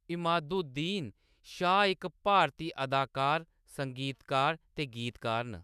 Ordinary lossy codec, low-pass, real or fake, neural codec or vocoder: none; 14.4 kHz; fake; autoencoder, 48 kHz, 128 numbers a frame, DAC-VAE, trained on Japanese speech